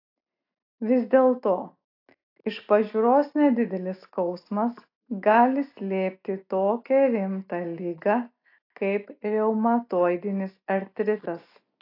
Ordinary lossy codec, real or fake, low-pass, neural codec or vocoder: AAC, 32 kbps; real; 5.4 kHz; none